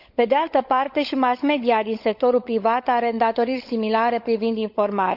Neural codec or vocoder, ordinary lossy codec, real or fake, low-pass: codec, 16 kHz, 16 kbps, FunCodec, trained on LibriTTS, 50 frames a second; none; fake; 5.4 kHz